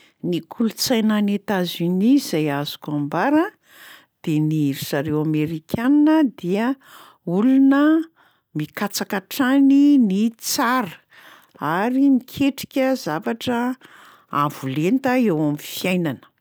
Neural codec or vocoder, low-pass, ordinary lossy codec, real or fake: none; none; none; real